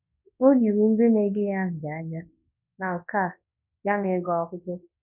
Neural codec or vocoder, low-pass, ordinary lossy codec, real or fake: codec, 24 kHz, 0.9 kbps, WavTokenizer, large speech release; 3.6 kHz; none; fake